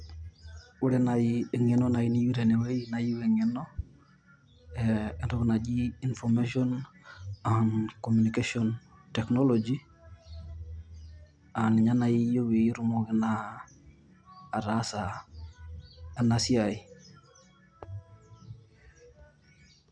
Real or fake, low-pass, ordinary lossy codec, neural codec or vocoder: real; 9.9 kHz; none; none